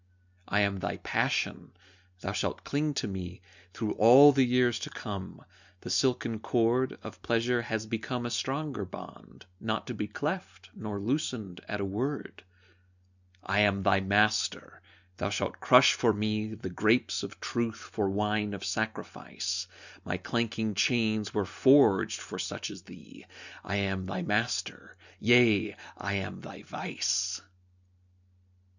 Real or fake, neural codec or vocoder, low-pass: real; none; 7.2 kHz